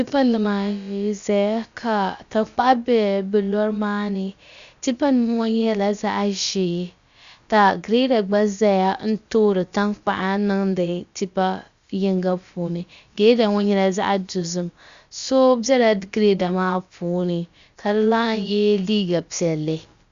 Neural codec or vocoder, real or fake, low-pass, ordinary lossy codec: codec, 16 kHz, about 1 kbps, DyCAST, with the encoder's durations; fake; 7.2 kHz; Opus, 64 kbps